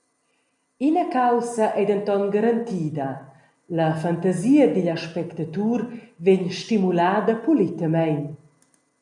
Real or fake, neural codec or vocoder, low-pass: real; none; 10.8 kHz